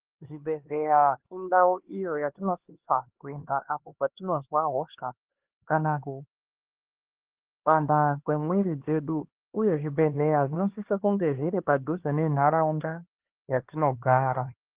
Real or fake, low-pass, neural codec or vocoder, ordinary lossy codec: fake; 3.6 kHz; codec, 16 kHz, 2 kbps, X-Codec, HuBERT features, trained on LibriSpeech; Opus, 16 kbps